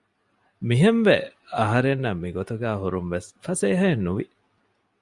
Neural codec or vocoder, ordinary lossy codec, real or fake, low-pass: none; Opus, 64 kbps; real; 10.8 kHz